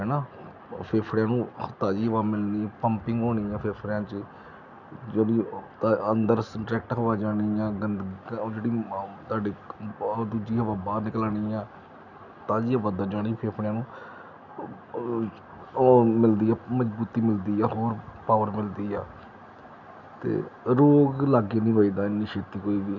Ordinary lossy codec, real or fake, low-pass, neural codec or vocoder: none; real; 7.2 kHz; none